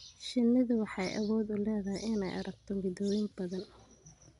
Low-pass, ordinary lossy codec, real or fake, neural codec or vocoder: 10.8 kHz; none; real; none